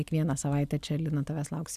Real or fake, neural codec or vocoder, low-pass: real; none; 14.4 kHz